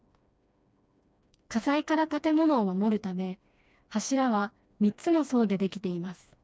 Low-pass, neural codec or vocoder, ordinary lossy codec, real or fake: none; codec, 16 kHz, 2 kbps, FreqCodec, smaller model; none; fake